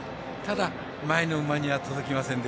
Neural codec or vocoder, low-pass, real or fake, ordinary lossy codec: none; none; real; none